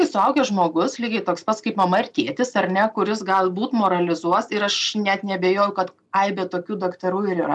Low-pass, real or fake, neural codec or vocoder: 10.8 kHz; real; none